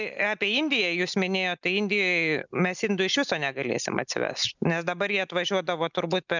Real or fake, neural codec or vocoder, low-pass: real; none; 7.2 kHz